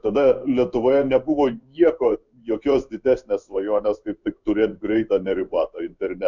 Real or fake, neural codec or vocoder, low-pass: fake; codec, 16 kHz in and 24 kHz out, 1 kbps, XY-Tokenizer; 7.2 kHz